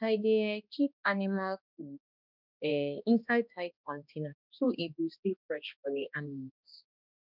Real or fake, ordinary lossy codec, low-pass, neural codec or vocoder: fake; none; 5.4 kHz; codec, 16 kHz, 1 kbps, X-Codec, HuBERT features, trained on balanced general audio